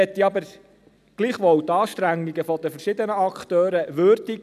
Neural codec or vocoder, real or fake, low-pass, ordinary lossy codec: none; real; 14.4 kHz; none